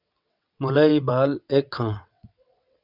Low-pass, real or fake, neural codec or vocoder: 5.4 kHz; fake; vocoder, 22.05 kHz, 80 mel bands, WaveNeXt